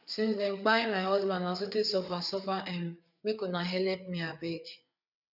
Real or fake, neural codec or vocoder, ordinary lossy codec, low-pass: fake; codec, 16 kHz, 4 kbps, FreqCodec, larger model; none; 5.4 kHz